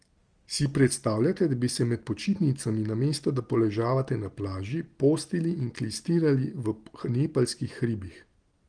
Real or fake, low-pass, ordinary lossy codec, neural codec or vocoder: real; 9.9 kHz; Opus, 24 kbps; none